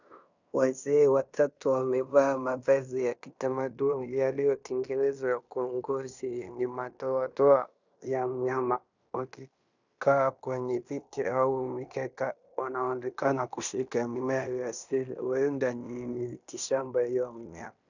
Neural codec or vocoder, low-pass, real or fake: codec, 16 kHz in and 24 kHz out, 0.9 kbps, LongCat-Audio-Codec, fine tuned four codebook decoder; 7.2 kHz; fake